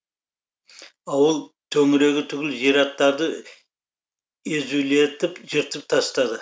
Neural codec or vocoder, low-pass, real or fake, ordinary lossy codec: none; none; real; none